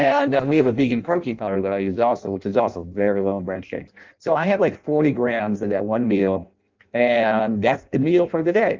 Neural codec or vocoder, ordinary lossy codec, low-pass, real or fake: codec, 16 kHz in and 24 kHz out, 0.6 kbps, FireRedTTS-2 codec; Opus, 24 kbps; 7.2 kHz; fake